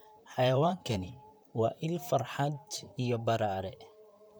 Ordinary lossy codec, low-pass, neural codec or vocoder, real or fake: none; none; vocoder, 44.1 kHz, 128 mel bands, Pupu-Vocoder; fake